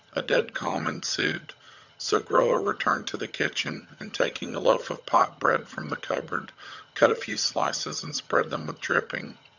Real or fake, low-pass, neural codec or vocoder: fake; 7.2 kHz; vocoder, 22.05 kHz, 80 mel bands, HiFi-GAN